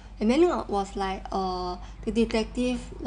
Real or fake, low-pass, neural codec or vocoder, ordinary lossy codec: real; 9.9 kHz; none; none